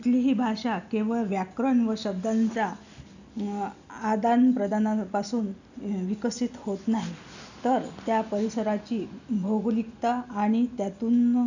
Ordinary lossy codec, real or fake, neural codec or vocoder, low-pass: none; real; none; 7.2 kHz